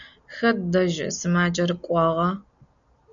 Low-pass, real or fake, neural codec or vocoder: 7.2 kHz; real; none